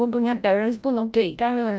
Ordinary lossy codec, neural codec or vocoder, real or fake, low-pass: none; codec, 16 kHz, 0.5 kbps, FreqCodec, larger model; fake; none